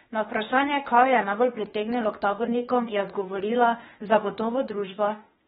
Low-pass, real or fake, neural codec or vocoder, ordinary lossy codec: 19.8 kHz; fake; autoencoder, 48 kHz, 32 numbers a frame, DAC-VAE, trained on Japanese speech; AAC, 16 kbps